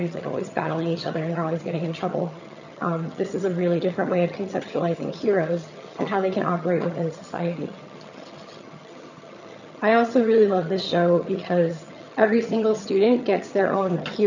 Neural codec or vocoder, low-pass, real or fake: vocoder, 22.05 kHz, 80 mel bands, HiFi-GAN; 7.2 kHz; fake